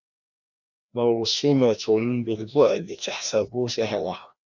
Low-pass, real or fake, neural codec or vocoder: 7.2 kHz; fake; codec, 16 kHz, 1 kbps, FreqCodec, larger model